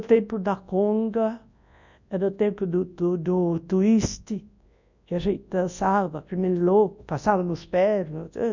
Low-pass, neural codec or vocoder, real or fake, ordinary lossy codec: 7.2 kHz; codec, 24 kHz, 0.9 kbps, WavTokenizer, large speech release; fake; none